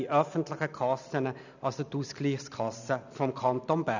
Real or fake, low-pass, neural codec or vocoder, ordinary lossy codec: real; 7.2 kHz; none; none